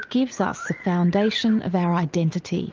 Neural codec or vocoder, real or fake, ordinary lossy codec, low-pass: vocoder, 44.1 kHz, 80 mel bands, Vocos; fake; Opus, 24 kbps; 7.2 kHz